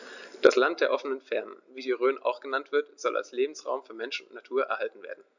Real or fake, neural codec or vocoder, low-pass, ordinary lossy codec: real; none; 7.2 kHz; none